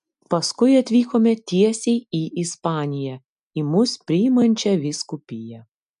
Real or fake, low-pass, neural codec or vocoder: real; 10.8 kHz; none